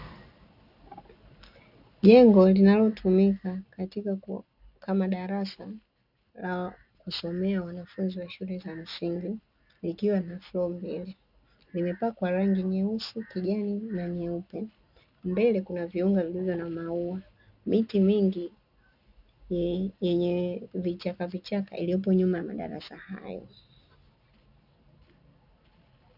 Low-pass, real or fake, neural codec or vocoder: 5.4 kHz; real; none